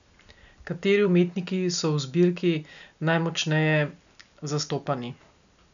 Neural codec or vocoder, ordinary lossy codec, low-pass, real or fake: none; none; 7.2 kHz; real